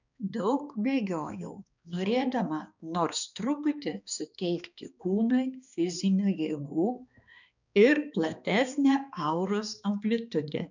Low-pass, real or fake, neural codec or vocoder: 7.2 kHz; fake; codec, 16 kHz, 2 kbps, X-Codec, HuBERT features, trained on balanced general audio